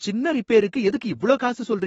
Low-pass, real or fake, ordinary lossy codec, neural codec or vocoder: 19.8 kHz; fake; AAC, 24 kbps; autoencoder, 48 kHz, 32 numbers a frame, DAC-VAE, trained on Japanese speech